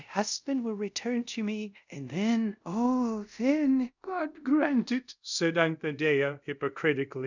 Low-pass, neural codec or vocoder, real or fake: 7.2 kHz; codec, 24 kHz, 0.5 kbps, DualCodec; fake